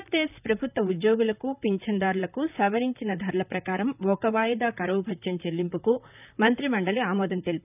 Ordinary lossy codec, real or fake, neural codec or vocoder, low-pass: none; fake; vocoder, 44.1 kHz, 128 mel bands, Pupu-Vocoder; 3.6 kHz